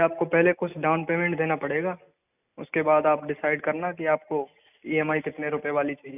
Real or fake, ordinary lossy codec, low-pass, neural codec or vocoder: real; none; 3.6 kHz; none